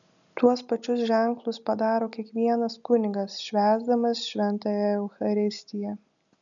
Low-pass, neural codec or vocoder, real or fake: 7.2 kHz; none; real